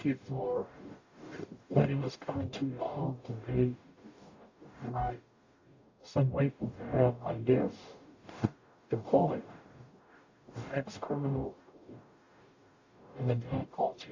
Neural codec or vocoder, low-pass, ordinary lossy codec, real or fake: codec, 44.1 kHz, 0.9 kbps, DAC; 7.2 kHz; AAC, 48 kbps; fake